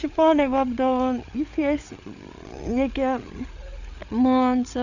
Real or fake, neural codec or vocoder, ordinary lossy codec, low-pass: fake; codec, 16 kHz, 8 kbps, FreqCodec, larger model; none; 7.2 kHz